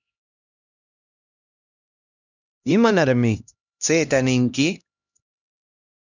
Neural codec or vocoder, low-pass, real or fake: codec, 16 kHz, 1 kbps, X-Codec, HuBERT features, trained on LibriSpeech; 7.2 kHz; fake